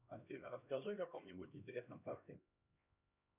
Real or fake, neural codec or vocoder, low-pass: fake; codec, 16 kHz, 1 kbps, X-Codec, HuBERT features, trained on LibriSpeech; 3.6 kHz